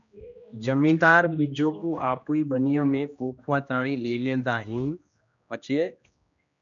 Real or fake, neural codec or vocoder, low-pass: fake; codec, 16 kHz, 1 kbps, X-Codec, HuBERT features, trained on general audio; 7.2 kHz